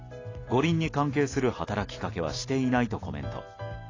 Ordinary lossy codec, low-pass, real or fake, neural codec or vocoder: AAC, 32 kbps; 7.2 kHz; real; none